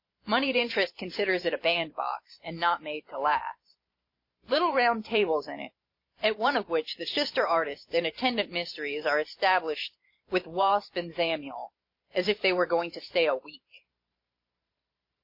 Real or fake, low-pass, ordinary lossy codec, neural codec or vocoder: fake; 5.4 kHz; MP3, 32 kbps; vocoder, 44.1 kHz, 128 mel bands every 512 samples, BigVGAN v2